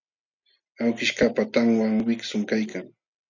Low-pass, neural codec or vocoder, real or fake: 7.2 kHz; none; real